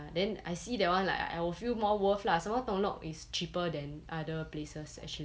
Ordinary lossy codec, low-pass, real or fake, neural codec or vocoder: none; none; real; none